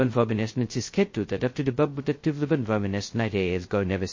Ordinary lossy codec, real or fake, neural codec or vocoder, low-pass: MP3, 32 kbps; fake; codec, 16 kHz, 0.2 kbps, FocalCodec; 7.2 kHz